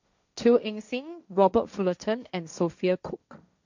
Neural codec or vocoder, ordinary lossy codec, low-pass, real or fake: codec, 16 kHz, 1.1 kbps, Voila-Tokenizer; none; 7.2 kHz; fake